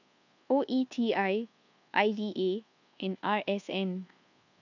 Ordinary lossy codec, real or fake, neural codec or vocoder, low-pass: none; fake; codec, 24 kHz, 1.2 kbps, DualCodec; 7.2 kHz